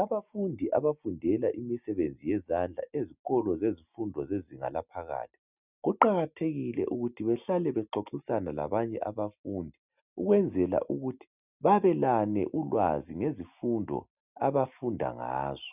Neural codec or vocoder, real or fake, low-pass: none; real; 3.6 kHz